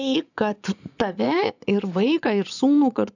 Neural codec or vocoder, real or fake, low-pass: vocoder, 24 kHz, 100 mel bands, Vocos; fake; 7.2 kHz